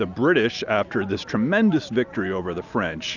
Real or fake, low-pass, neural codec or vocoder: real; 7.2 kHz; none